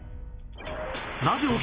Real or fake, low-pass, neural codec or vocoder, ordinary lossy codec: real; 3.6 kHz; none; Opus, 24 kbps